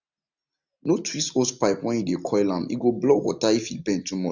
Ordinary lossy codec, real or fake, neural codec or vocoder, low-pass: none; real; none; 7.2 kHz